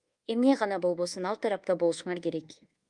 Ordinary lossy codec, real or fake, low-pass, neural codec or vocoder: Opus, 32 kbps; fake; 10.8 kHz; codec, 24 kHz, 1.2 kbps, DualCodec